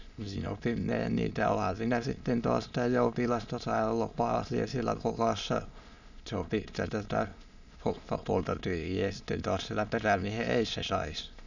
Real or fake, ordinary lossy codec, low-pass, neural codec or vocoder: fake; none; 7.2 kHz; autoencoder, 22.05 kHz, a latent of 192 numbers a frame, VITS, trained on many speakers